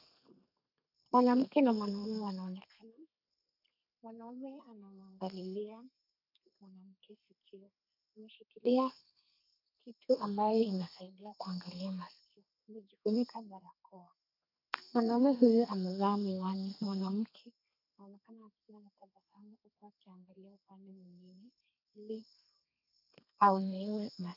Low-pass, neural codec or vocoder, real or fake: 5.4 kHz; codec, 32 kHz, 1.9 kbps, SNAC; fake